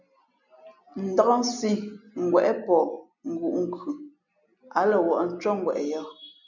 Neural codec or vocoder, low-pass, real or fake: none; 7.2 kHz; real